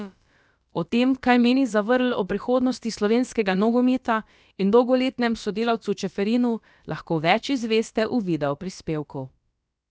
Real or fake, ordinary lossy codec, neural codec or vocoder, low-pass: fake; none; codec, 16 kHz, about 1 kbps, DyCAST, with the encoder's durations; none